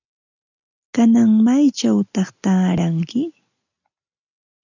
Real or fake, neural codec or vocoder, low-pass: real; none; 7.2 kHz